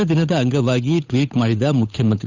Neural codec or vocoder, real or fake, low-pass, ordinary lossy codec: codec, 16 kHz, 8 kbps, FunCodec, trained on Chinese and English, 25 frames a second; fake; 7.2 kHz; none